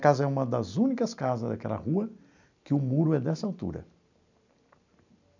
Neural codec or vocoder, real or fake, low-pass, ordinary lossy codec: none; real; 7.2 kHz; none